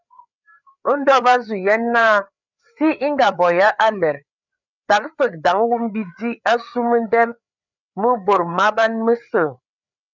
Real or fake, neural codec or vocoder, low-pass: fake; codec, 16 kHz, 4 kbps, FreqCodec, larger model; 7.2 kHz